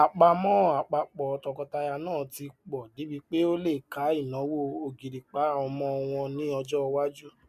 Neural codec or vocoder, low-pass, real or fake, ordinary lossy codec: none; 14.4 kHz; real; MP3, 96 kbps